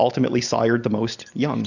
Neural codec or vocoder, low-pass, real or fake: none; 7.2 kHz; real